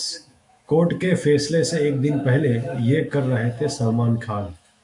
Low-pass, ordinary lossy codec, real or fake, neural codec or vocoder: 10.8 kHz; MP3, 96 kbps; fake; autoencoder, 48 kHz, 128 numbers a frame, DAC-VAE, trained on Japanese speech